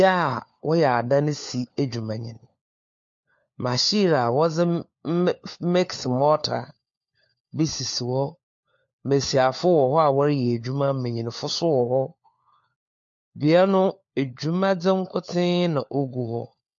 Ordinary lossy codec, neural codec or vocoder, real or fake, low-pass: MP3, 48 kbps; codec, 16 kHz, 4 kbps, FunCodec, trained on LibriTTS, 50 frames a second; fake; 7.2 kHz